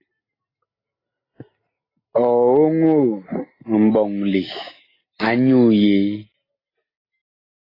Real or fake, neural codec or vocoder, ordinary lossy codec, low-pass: real; none; AAC, 24 kbps; 5.4 kHz